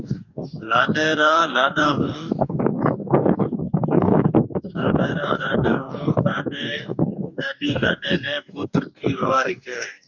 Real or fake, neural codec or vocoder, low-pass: fake; codec, 44.1 kHz, 2.6 kbps, DAC; 7.2 kHz